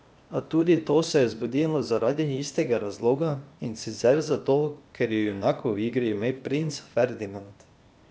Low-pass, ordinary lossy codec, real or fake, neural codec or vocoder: none; none; fake; codec, 16 kHz, 0.8 kbps, ZipCodec